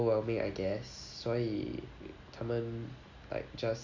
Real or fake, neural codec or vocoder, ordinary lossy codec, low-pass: real; none; Opus, 64 kbps; 7.2 kHz